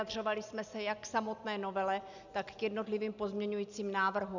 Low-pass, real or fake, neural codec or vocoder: 7.2 kHz; real; none